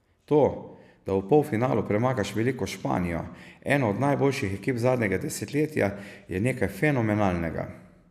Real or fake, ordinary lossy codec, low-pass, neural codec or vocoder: real; none; 14.4 kHz; none